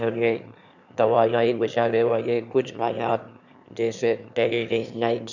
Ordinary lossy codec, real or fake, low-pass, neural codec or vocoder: none; fake; 7.2 kHz; autoencoder, 22.05 kHz, a latent of 192 numbers a frame, VITS, trained on one speaker